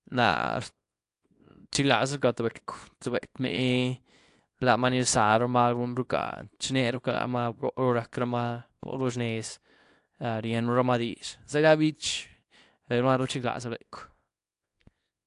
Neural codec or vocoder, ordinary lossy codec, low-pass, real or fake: codec, 24 kHz, 0.9 kbps, WavTokenizer, small release; AAC, 64 kbps; 10.8 kHz; fake